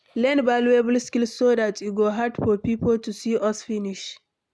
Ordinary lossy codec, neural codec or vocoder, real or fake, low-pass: none; none; real; none